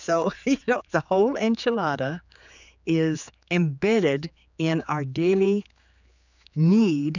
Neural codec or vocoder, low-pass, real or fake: codec, 16 kHz, 4 kbps, X-Codec, HuBERT features, trained on general audio; 7.2 kHz; fake